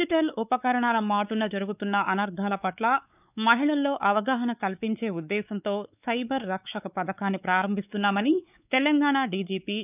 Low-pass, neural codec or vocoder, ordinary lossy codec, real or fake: 3.6 kHz; codec, 16 kHz, 4 kbps, X-Codec, WavLM features, trained on Multilingual LibriSpeech; none; fake